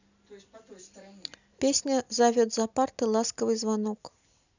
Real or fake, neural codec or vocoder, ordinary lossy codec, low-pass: real; none; none; 7.2 kHz